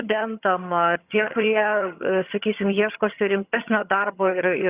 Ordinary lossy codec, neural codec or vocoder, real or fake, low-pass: Opus, 64 kbps; vocoder, 22.05 kHz, 80 mel bands, HiFi-GAN; fake; 3.6 kHz